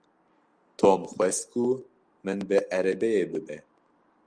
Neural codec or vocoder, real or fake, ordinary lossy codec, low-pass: none; real; Opus, 32 kbps; 9.9 kHz